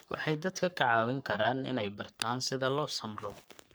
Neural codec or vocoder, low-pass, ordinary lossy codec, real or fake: codec, 44.1 kHz, 3.4 kbps, Pupu-Codec; none; none; fake